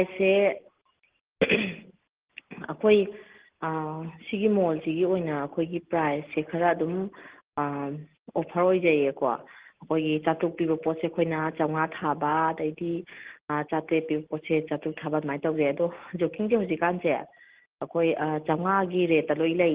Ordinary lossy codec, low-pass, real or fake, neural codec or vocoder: Opus, 16 kbps; 3.6 kHz; real; none